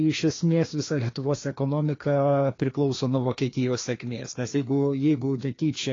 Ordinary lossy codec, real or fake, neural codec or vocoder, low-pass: AAC, 32 kbps; fake; codec, 16 kHz, 2 kbps, FreqCodec, larger model; 7.2 kHz